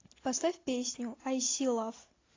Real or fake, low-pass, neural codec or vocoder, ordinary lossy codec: real; 7.2 kHz; none; AAC, 32 kbps